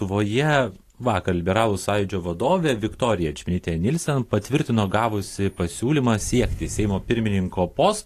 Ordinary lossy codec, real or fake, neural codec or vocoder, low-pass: AAC, 48 kbps; real; none; 14.4 kHz